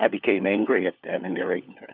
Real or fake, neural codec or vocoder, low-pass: fake; codec, 16 kHz, 4 kbps, FunCodec, trained on LibriTTS, 50 frames a second; 5.4 kHz